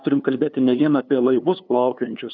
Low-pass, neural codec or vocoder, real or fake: 7.2 kHz; codec, 16 kHz, 2 kbps, FunCodec, trained on LibriTTS, 25 frames a second; fake